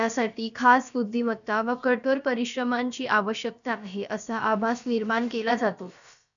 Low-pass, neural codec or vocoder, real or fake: 7.2 kHz; codec, 16 kHz, about 1 kbps, DyCAST, with the encoder's durations; fake